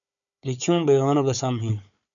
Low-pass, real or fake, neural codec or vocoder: 7.2 kHz; fake; codec, 16 kHz, 4 kbps, FunCodec, trained on Chinese and English, 50 frames a second